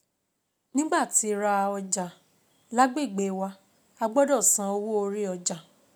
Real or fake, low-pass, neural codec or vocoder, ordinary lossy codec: real; none; none; none